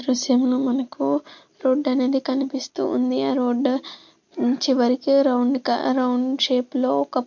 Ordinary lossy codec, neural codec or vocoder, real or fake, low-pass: MP3, 48 kbps; none; real; 7.2 kHz